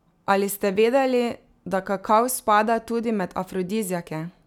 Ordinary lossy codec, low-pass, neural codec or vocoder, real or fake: none; 19.8 kHz; none; real